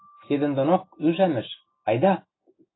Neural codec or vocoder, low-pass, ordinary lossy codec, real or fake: none; 7.2 kHz; AAC, 16 kbps; real